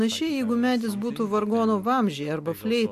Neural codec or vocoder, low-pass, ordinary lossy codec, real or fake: none; 14.4 kHz; MP3, 64 kbps; real